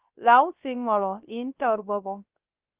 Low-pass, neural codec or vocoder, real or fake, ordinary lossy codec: 3.6 kHz; codec, 16 kHz, 0.3 kbps, FocalCodec; fake; Opus, 24 kbps